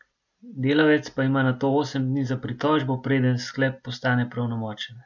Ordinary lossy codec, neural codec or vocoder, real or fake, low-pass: none; none; real; 7.2 kHz